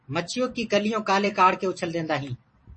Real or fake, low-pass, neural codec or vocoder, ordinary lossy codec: fake; 10.8 kHz; vocoder, 44.1 kHz, 128 mel bands every 256 samples, BigVGAN v2; MP3, 32 kbps